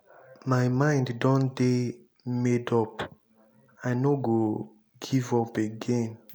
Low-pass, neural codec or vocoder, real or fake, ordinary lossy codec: none; none; real; none